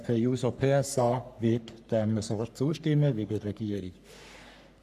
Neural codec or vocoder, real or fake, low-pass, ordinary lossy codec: codec, 44.1 kHz, 3.4 kbps, Pupu-Codec; fake; 14.4 kHz; none